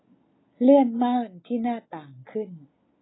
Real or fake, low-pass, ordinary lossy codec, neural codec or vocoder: real; 7.2 kHz; AAC, 16 kbps; none